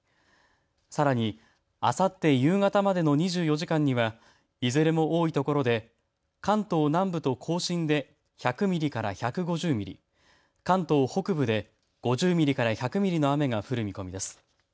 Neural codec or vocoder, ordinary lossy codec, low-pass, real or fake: none; none; none; real